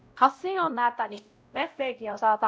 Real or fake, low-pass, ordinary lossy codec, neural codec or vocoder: fake; none; none; codec, 16 kHz, 0.5 kbps, X-Codec, WavLM features, trained on Multilingual LibriSpeech